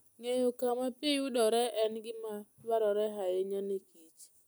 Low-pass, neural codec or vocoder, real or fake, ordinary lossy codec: none; none; real; none